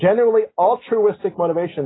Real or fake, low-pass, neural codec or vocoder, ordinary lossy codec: real; 7.2 kHz; none; AAC, 16 kbps